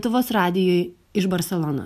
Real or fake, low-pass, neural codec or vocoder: real; 14.4 kHz; none